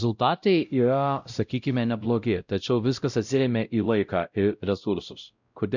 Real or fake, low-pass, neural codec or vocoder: fake; 7.2 kHz; codec, 16 kHz, 0.5 kbps, X-Codec, WavLM features, trained on Multilingual LibriSpeech